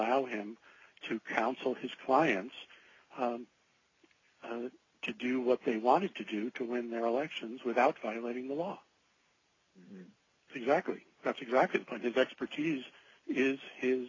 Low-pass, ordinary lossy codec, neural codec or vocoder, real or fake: 7.2 kHz; AAC, 32 kbps; none; real